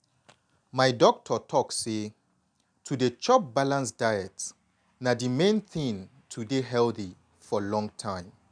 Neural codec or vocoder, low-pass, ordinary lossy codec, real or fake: none; 9.9 kHz; none; real